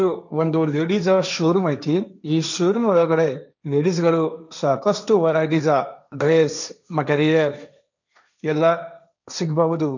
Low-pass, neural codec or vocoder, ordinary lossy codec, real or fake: 7.2 kHz; codec, 16 kHz, 1.1 kbps, Voila-Tokenizer; none; fake